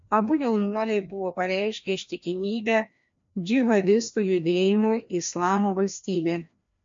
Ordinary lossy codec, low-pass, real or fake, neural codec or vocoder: MP3, 48 kbps; 7.2 kHz; fake; codec, 16 kHz, 1 kbps, FreqCodec, larger model